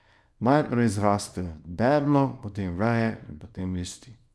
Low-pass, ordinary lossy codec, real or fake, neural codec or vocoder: none; none; fake; codec, 24 kHz, 0.9 kbps, WavTokenizer, small release